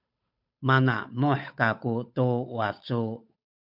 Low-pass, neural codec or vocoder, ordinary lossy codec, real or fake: 5.4 kHz; codec, 16 kHz, 8 kbps, FunCodec, trained on Chinese and English, 25 frames a second; MP3, 48 kbps; fake